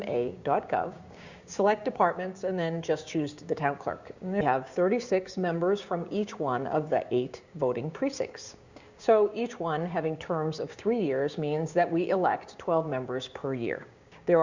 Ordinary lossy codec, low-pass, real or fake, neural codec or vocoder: Opus, 64 kbps; 7.2 kHz; fake; codec, 16 kHz, 6 kbps, DAC